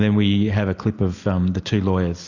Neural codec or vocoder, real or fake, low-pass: none; real; 7.2 kHz